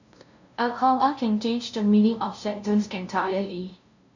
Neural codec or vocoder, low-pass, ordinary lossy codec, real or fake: codec, 16 kHz, 0.5 kbps, FunCodec, trained on LibriTTS, 25 frames a second; 7.2 kHz; none; fake